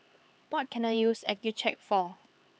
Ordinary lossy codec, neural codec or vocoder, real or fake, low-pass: none; codec, 16 kHz, 4 kbps, X-Codec, HuBERT features, trained on LibriSpeech; fake; none